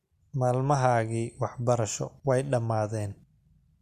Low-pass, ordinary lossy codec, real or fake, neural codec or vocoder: 14.4 kHz; Opus, 64 kbps; real; none